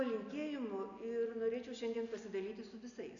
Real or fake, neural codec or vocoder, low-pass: real; none; 7.2 kHz